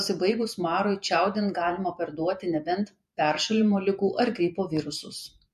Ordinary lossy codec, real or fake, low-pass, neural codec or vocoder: MP3, 64 kbps; fake; 14.4 kHz; vocoder, 48 kHz, 128 mel bands, Vocos